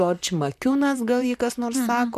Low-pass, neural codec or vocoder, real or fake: 14.4 kHz; vocoder, 44.1 kHz, 128 mel bands, Pupu-Vocoder; fake